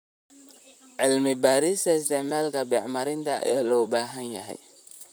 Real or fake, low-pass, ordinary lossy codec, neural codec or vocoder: fake; none; none; vocoder, 44.1 kHz, 128 mel bands, Pupu-Vocoder